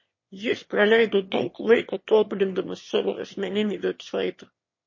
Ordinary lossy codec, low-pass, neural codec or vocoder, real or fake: MP3, 32 kbps; 7.2 kHz; autoencoder, 22.05 kHz, a latent of 192 numbers a frame, VITS, trained on one speaker; fake